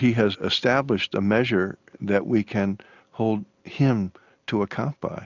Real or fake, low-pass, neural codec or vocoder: real; 7.2 kHz; none